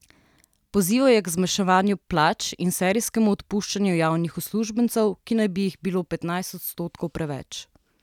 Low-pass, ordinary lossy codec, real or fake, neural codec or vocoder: 19.8 kHz; none; real; none